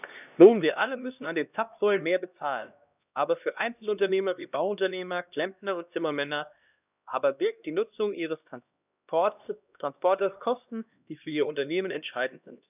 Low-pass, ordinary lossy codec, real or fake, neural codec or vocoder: 3.6 kHz; none; fake; codec, 16 kHz, 1 kbps, X-Codec, HuBERT features, trained on LibriSpeech